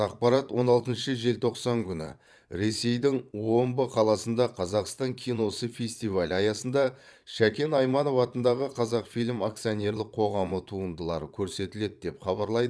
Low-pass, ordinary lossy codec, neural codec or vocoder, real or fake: none; none; vocoder, 22.05 kHz, 80 mel bands, Vocos; fake